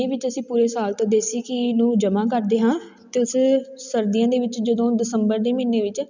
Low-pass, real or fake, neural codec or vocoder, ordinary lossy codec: 7.2 kHz; real; none; none